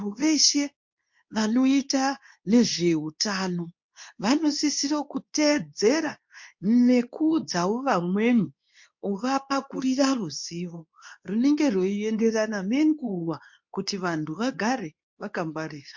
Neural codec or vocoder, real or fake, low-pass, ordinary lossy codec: codec, 24 kHz, 0.9 kbps, WavTokenizer, medium speech release version 2; fake; 7.2 kHz; MP3, 48 kbps